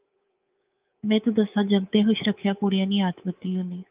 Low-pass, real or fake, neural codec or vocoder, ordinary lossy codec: 3.6 kHz; fake; codec, 24 kHz, 3.1 kbps, DualCodec; Opus, 24 kbps